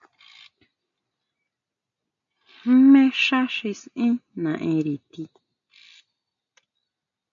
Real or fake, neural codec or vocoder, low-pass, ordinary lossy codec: real; none; 7.2 kHz; MP3, 64 kbps